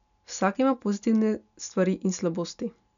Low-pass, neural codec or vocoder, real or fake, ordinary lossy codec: 7.2 kHz; none; real; none